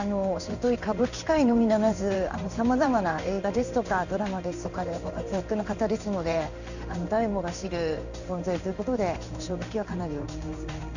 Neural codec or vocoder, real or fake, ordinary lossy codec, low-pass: codec, 16 kHz in and 24 kHz out, 1 kbps, XY-Tokenizer; fake; none; 7.2 kHz